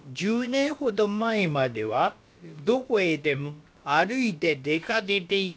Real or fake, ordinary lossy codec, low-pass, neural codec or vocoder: fake; none; none; codec, 16 kHz, about 1 kbps, DyCAST, with the encoder's durations